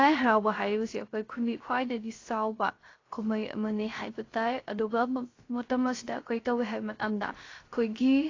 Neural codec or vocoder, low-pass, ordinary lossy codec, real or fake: codec, 16 kHz, 0.3 kbps, FocalCodec; 7.2 kHz; AAC, 32 kbps; fake